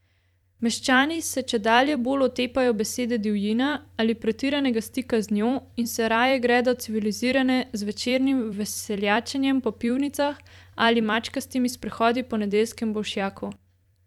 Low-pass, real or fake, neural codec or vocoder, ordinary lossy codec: 19.8 kHz; fake; vocoder, 44.1 kHz, 128 mel bands every 256 samples, BigVGAN v2; none